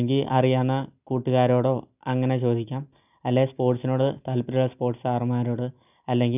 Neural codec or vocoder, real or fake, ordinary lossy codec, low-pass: none; real; none; 3.6 kHz